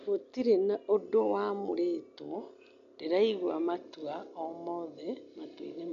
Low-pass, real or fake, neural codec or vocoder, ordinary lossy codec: 7.2 kHz; real; none; MP3, 64 kbps